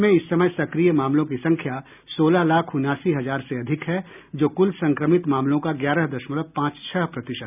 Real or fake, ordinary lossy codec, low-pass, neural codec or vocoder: real; none; 3.6 kHz; none